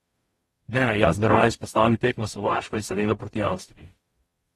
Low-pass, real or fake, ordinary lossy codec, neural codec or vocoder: 19.8 kHz; fake; AAC, 32 kbps; codec, 44.1 kHz, 0.9 kbps, DAC